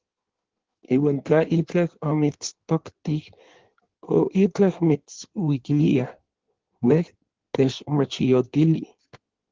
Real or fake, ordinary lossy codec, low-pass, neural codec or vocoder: fake; Opus, 16 kbps; 7.2 kHz; codec, 16 kHz in and 24 kHz out, 1.1 kbps, FireRedTTS-2 codec